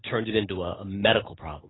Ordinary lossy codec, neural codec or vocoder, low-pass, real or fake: AAC, 16 kbps; none; 7.2 kHz; real